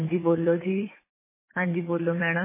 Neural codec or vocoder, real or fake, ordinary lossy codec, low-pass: vocoder, 44.1 kHz, 80 mel bands, Vocos; fake; MP3, 16 kbps; 3.6 kHz